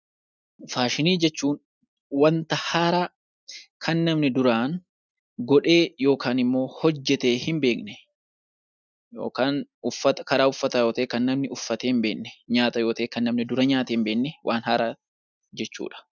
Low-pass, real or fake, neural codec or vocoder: 7.2 kHz; real; none